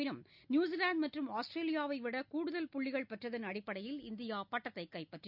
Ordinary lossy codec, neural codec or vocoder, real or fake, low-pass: none; none; real; 5.4 kHz